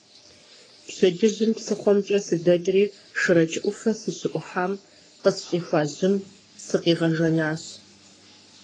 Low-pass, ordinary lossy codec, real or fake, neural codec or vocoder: 9.9 kHz; AAC, 32 kbps; fake; codec, 44.1 kHz, 3.4 kbps, Pupu-Codec